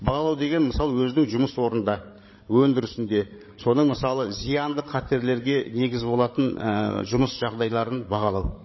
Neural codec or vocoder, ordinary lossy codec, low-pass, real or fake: codec, 16 kHz, 16 kbps, FreqCodec, larger model; MP3, 24 kbps; 7.2 kHz; fake